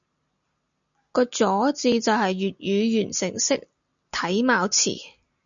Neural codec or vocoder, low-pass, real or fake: none; 7.2 kHz; real